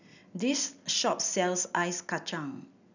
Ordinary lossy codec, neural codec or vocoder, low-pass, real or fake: none; none; 7.2 kHz; real